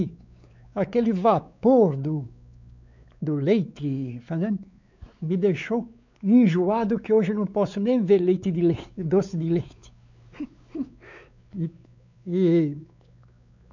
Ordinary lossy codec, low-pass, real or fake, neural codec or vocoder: none; 7.2 kHz; fake; codec, 16 kHz, 4 kbps, X-Codec, WavLM features, trained on Multilingual LibriSpeech